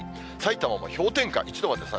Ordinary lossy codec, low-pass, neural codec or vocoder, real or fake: none; none; none; real